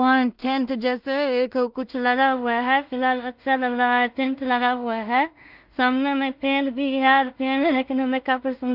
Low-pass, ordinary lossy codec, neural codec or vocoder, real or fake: 5.4 kHz; Opus, 24 kbps; codec, 16 kHz in and 24 kHz out, 0.4 kbps, LongCat-Audio-Codec, two codebook decoder; fake